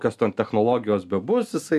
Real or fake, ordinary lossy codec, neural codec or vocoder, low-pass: real; MP3, 96 kbps; none; 14.4 kHz